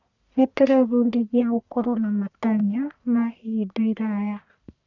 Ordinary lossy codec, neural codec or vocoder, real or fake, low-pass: none; codec, 44.1 kHz, 1.7 kbps, Pupu-Codec; fake; 7.2 kHz